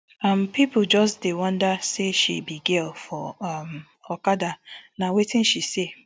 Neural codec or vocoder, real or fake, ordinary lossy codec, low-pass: none; real; none; none